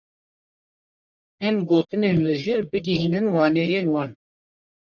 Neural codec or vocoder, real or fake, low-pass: codec, 44.1 kHz, 1.7 kbps, Pupu-Codec; fake; 7.2 kHz